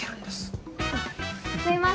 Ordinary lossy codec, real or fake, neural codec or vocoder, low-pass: none; real; none; none